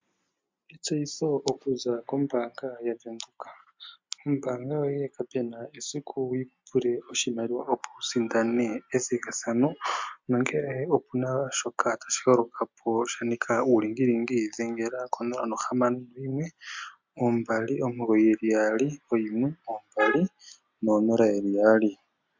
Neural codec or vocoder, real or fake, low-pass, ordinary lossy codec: none; real; 7.2 kHz; MP3, 64 kbps